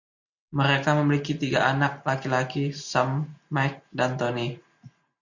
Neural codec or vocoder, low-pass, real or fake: none; 7.2 kHz; real